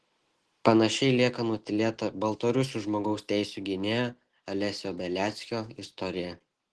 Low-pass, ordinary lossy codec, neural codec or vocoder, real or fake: 10.8 kHz; Opus, 16 kbps; none; real